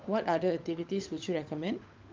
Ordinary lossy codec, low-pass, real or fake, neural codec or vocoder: Opus, 24 kbps; 7.2 kHz; fake; codec, 16 kHz, 16 kbps, FunCodec, trained on LibriTTS, 50 frames a second